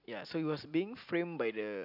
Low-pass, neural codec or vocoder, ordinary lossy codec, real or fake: 5.4 kHz; none; none; real